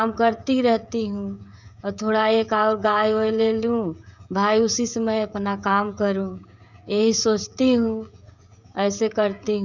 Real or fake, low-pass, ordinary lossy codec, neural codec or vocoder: fake; 7.2 kHz; none; codec, 16 kHz, 16 kbps, FreqCodec, smaller model